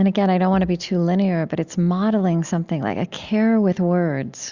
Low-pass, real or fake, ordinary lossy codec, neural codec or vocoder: 7.2 kHz; real; Opus, 64 kbps; none